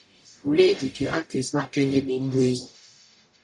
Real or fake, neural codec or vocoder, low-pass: fake; codec, 44.1 kHz, 0.9 kbps, DAC; 10.8 kHz